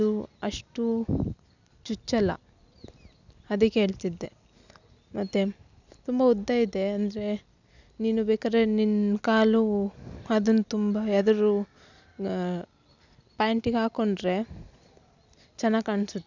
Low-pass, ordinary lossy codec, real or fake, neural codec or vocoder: 7.2 kHz; none; real; none